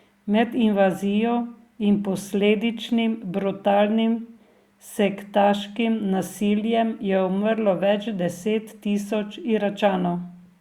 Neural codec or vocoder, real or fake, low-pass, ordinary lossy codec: none; real; 19.8 kHz; Opus, 64 kbps